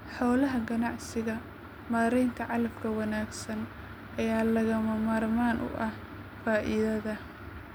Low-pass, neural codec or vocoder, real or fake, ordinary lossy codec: none; none; real; none